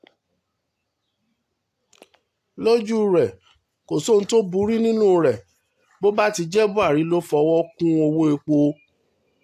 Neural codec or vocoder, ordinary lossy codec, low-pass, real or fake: none; AAC, 64 kbps; 14.4 kHz; real